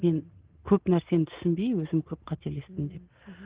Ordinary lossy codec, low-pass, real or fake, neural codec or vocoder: Opus, 16 kbps; 3.6 kHz; real; none